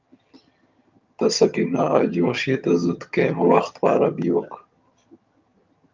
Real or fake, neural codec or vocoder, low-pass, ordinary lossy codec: fake; vocoder, 22.05 kHz, 80 mel bands, HiFi-GAN; 7.2 kHz; Opus, 24 kbps